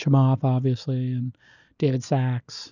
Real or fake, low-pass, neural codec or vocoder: real; 7.2 kHz; none